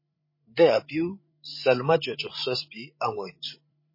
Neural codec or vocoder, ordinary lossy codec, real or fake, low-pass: codec, 16 kHz, 16 kbps, FreqCodec, larger model; MP3, 24 kbps; fake; 5.4 kHz